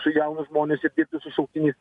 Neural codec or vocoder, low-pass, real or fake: none; 10.8 kHz; real